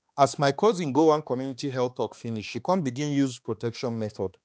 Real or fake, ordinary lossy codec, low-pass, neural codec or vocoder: fake; none; none; codec, 16 kHz, 2 kbps, X-Codec, HuBERT features, trained on balanced general audio